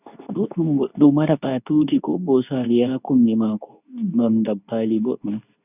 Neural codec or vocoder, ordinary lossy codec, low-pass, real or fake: codec, 24 kHz, 0.9 kbps, WavTokenizer, medium speech release version 2; none; 3.6 kHz; fake